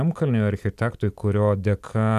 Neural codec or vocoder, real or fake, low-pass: none; real; 14.4 kHz